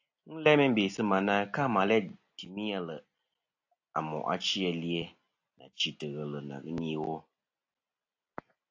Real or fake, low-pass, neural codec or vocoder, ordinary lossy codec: real; 7.2 kHz; none; AAC, 48 kbps